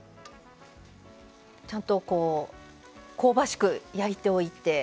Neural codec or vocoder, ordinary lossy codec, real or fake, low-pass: none; none; real; none